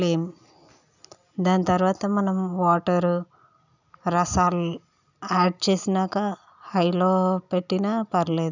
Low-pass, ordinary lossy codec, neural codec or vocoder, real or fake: 7.2 kHz; none; none; real